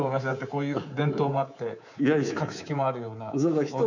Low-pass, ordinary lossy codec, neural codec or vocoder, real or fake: 7.2 kHz; none; codec, 24 kHz, 3.1 kbps, DualCodec; fake